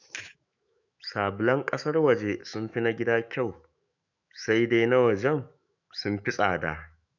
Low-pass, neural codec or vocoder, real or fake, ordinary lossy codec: 7.2 kHz; autoencoder, 48 kHz, 128 numbers a frame, DAC-VAE, trained on Japanese speech; fake; none